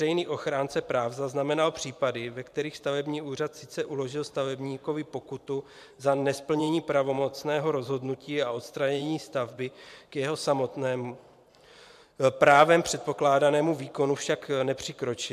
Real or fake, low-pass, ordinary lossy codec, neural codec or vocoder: fake; 14.4 kHz; MP3, 96 kbps; vocoder, 44.1 kHz, 128 mel bands every 512 samples, BigVGAN v2